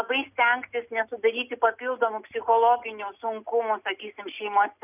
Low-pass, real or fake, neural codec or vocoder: 3.6 kHz; real; none